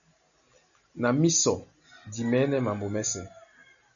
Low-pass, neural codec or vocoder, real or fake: 7.2 kHz; none; real